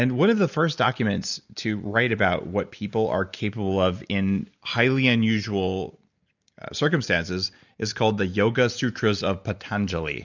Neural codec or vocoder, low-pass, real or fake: none; 7.2 kHz; real